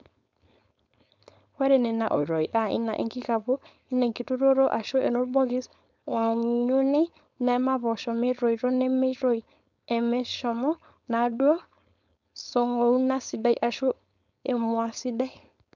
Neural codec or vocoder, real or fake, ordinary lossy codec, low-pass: codec, 16 kHz, 4.8 kbps, FACodec; fake; none; 7.2 kHz